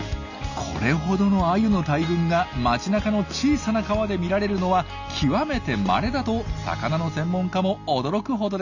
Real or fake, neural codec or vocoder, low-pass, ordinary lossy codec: real; none; 7.2 kHz; none